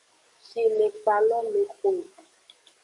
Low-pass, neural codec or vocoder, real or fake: 10.8 kHz; codec, 44.1 kHz, 7.8 kbps, DAC; fake